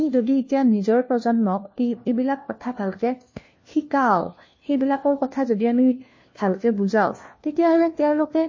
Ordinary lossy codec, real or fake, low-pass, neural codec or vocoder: MP3, 32 kbps; fake; 7.2 kHz; codec, 16 kHz, 1 kbps, FunCodec, trained on LibriTTS, 50 frames a second